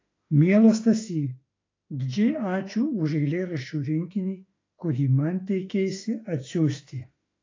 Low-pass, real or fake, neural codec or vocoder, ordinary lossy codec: 7.2 kHz; fake; autoencoder, 48 kHz, 32 numbers a frame, DAC-VAE, trained on Japanese speech; AAC, 32 kbps